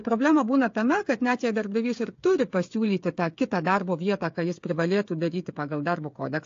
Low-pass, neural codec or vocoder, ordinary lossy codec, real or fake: 7.2 kHz; codec, 16 kHz, 8 kbps, FreqCodec, smaller model; AAC, 48 kbps; fake